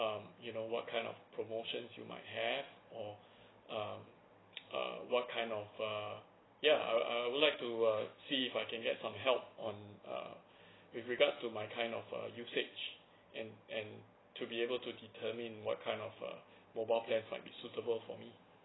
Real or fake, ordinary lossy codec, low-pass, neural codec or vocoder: real; AAC, 16 kbps; 7.2 kHz; none